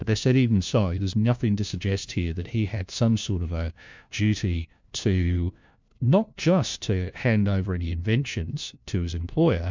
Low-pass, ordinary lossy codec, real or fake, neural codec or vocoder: 7.2 kHz; MP3, 64 kbps; fake; codec, 16 kHz, 1 kbps, FunCodec, trained on LibriTTS, 50 frames a second